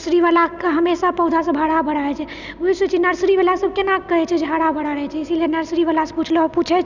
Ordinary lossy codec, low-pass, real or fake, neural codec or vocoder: none; 7.2 kHz; real; none